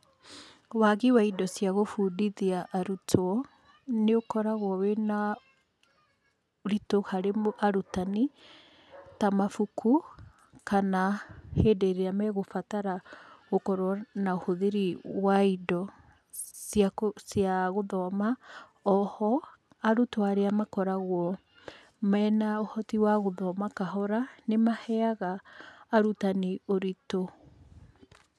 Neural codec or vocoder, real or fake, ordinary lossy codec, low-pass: none; real; none; none